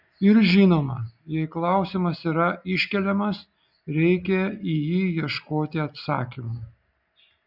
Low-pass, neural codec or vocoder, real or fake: 5.4 kHz; none; real